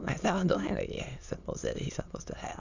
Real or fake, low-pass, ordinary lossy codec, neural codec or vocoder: fake; 7.2 kHz; none; autoencoder, 22.05 kHz, a latent of 192 numbers a frame, VITS, trained on many speakers